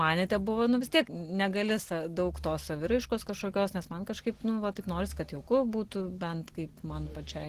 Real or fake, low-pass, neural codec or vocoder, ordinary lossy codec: real; 14.4 kHz; none; Opus, 16 kbps